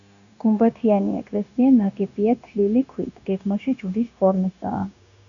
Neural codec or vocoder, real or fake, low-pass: codec, 16 kHz, 0.9 kbps, LongCat-Audio-Codec; fake; 7.2 kHz